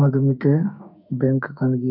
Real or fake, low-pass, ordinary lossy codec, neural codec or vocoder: fake; 5.4 kHz; none; autoencoder, 48 kHz, 32 numbers a frame, DAC-VAE, trained on Japanese speech